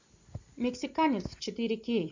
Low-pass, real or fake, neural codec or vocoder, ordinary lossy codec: 7.2 kHz; real; none; AAC, 48 kbps